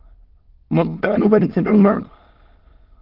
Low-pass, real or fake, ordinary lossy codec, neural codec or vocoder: 5.4 kHz; fake; Opus, 16 kbps; autoencoder, 22.05 kHz, a latent of 192 numbers a frame, VITS, trained on many speakers